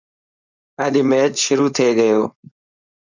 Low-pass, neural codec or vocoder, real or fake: 7.2 kHz; codec, 16 kHz, 4.8 kbps, FACodec; fake